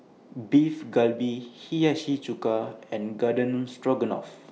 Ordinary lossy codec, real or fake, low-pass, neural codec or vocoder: none; real; none; none